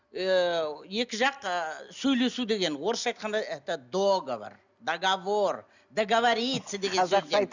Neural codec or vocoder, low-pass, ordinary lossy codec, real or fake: none; 7.2 kHz; none; real